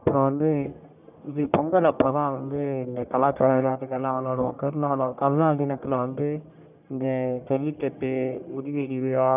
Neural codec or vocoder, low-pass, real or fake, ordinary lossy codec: codec, 44.1 kHz, 1.7 kbps, Pupu-Codec; 3.6 kHz; fake; none